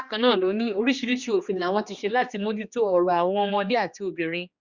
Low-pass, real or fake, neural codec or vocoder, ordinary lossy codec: 7.2 kHz; fake; codec, 16 kHz, 2 kbps, X-Codec, HuBERT features, trained on general audio; Opus, 64 kbps